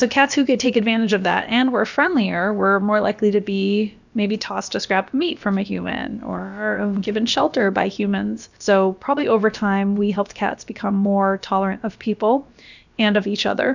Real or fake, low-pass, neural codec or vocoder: fake; 7.2 kHz; codec, 16 kHz, about 1 kbps, DyCAST, with the encoder's durations